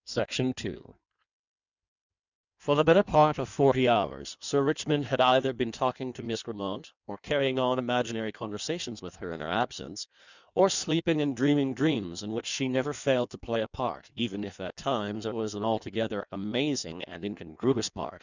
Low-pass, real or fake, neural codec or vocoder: 7.2 kHz; fake; codec, 16 kHz in and 24 kHz out, 1.1 kbps, FireRedTTS-2 codec